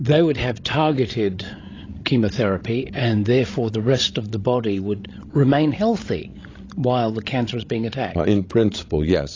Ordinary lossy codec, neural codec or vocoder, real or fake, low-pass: AAC, 32 kbps; codec, 16 kHz, 16 kbps, FreqCodec, larger model; fake; 7.2 kHz